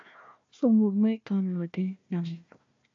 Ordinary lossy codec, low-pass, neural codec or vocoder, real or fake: AAC, 48 kbps; 7.2 kHz; codec, 16 kHz, 1 kbps, FunCodec, trained on Chinese and English, 50 frames a second; fake